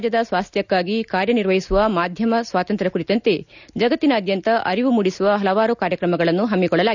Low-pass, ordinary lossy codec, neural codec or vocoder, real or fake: 7.2 kHz; none; none; real